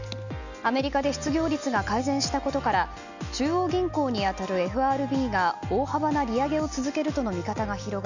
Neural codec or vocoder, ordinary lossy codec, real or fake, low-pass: none; none; real; 7.2 kHz